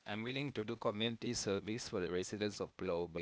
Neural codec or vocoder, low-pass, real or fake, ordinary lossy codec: codec, 16 kHz, 0.8 kbps, ZipCodec; none; fake; none